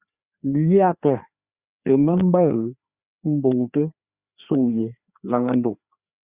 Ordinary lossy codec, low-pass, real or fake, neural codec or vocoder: Opus, 64 kbps; 3.6 kHz; fake; codec, 16 kHz, 2 kbps, FreqCodec, larger model